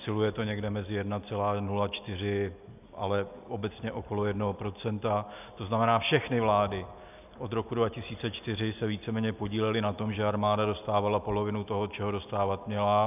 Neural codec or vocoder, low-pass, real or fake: none; 3.6 kHz; real